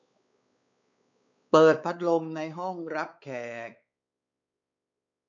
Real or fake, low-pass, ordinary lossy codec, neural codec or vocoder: fake; 7.2 kHz; MP3, 96 kbps; codec, 16 kHz, 4 kbps, X-Codec, WavLM features, trained on Multilingual LibriSpeech